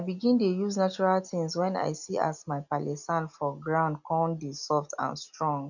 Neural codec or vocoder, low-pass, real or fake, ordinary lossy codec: none; 7.2 kHz; real; none